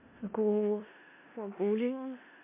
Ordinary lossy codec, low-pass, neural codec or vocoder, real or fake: MP3, 24 kbps; 3.6 kHz; codec, 16 kHz in and 24 kHz out, 0.4 kbps, LongCat-Audio-Codec, four codebook decoder; fake